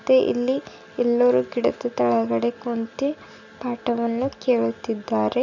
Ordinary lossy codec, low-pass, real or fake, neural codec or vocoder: none; 7.2 kHz; real; none